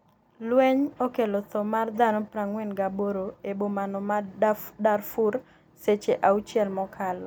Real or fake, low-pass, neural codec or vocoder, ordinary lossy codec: real; none; none; none